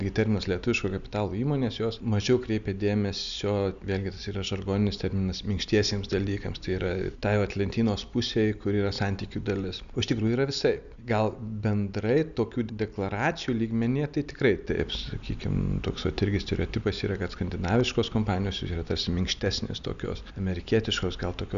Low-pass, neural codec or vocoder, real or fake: 7.2 kHz; none; real